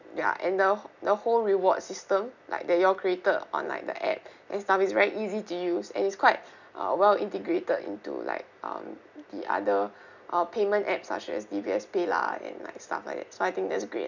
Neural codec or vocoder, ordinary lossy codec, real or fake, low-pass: none; none; real; 7.2 kHz